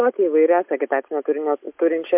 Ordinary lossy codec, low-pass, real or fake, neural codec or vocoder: MP3, 32 kbps; 3.6 kHz; real; none